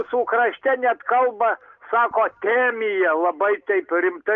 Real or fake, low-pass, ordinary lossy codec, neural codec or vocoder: real; 7.2 kHz; Opus, 32 kbps; none